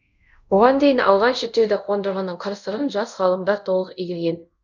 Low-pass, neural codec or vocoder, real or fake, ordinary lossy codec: 7.2 kHz; codec, 24 kHz, 0.5 kbps, DualCodec; fake; none